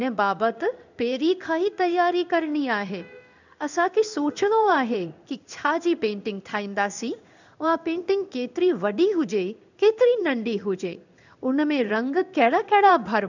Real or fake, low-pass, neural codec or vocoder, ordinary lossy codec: fake; 7.2 kHz; codec, 16 kHz in and 24 kHz out, 1 kbps, XY-Tokenizer; none